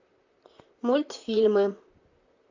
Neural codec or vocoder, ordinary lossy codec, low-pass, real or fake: vocoder, 44.1 kHz, 128 mel bands, Pupu-Vocoder; AAC, 48 kbps; 7.2 kHz; fake